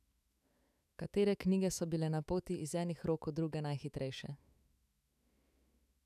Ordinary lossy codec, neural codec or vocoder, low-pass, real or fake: none; autoencoder, 48 kHz, 128 numbers a frame, DAC-VAE, trained on Japanese speech; 14.4 kHz; fake